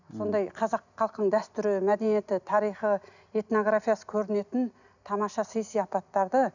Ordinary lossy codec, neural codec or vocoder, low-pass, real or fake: none; none; 7.2 kHz; real